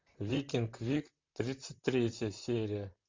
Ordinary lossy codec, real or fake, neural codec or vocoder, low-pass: MP3, 64 kbps; fake; vocoder, 44.1 kHz, 128 mel bands, Pupu-Vocoder; 7.2 kHz